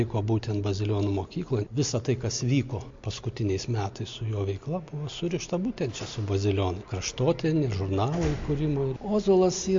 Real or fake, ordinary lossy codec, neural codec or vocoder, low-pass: real; MP3, 48 kbps; none; 7.2 kHz